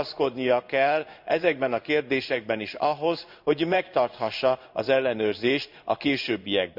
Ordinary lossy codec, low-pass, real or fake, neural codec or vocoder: none; 5.4 kHz; fake; codec, 16 kHz in and 24 kHz out, 1 kbps, XY-Tokenizer